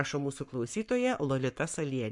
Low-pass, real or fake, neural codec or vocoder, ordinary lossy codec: 10.8 kHz; fake; codec, 44.1 kHz, 7.8 kbps, Pupu-Codec; MP3, 64 kbps